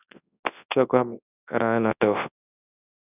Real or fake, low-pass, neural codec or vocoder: fake; 3.6 kHz; codec, 24 kHz, 0.9 kbps, WavTokenizer, large speech release